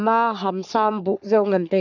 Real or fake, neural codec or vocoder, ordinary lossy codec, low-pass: fake; codec, 44.1 kHz, 3.4 kbps, Pupu-Codec; none; 7.2 kHz